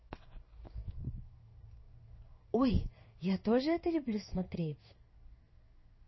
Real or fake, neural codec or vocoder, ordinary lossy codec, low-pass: fake; codec, 16 kHz in and 24 kHz out, 1 kbps, XY-Tokenizer; MP3, 24 kbps; 7.2 kHz